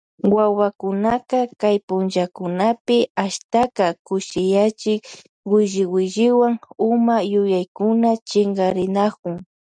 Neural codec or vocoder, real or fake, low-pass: none; real; 9.9 kHz